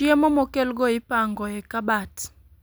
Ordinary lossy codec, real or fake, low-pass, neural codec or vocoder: none; real; none; none